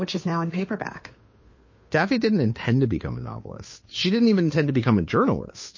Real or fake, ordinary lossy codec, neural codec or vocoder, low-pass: fake; MP3, 32 kbps; codec, 16 kHz, 2 kbps, FunCodec, trained on Chinese and English, 25 frames a second; 7.2 kHz